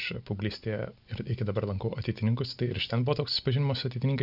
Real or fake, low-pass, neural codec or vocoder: real; 5.4 kHz; none